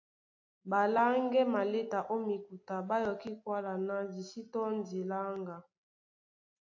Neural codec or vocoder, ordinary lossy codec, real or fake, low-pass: none; AAC, 48 kbps; real; 7.2 kHz